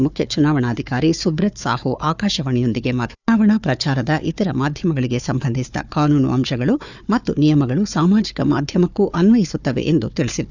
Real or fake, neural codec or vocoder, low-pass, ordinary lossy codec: fake; codec, 16 kHz, 4 kbps, FunCodec, trained on Chinese and English, 50 frames a second; 7.2 kHz; none